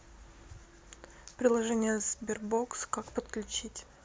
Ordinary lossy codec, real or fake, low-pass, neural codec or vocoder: none; real; none; none